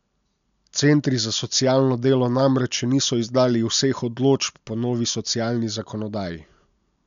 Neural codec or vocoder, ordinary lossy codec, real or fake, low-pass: none; none; real; 7.2 kHz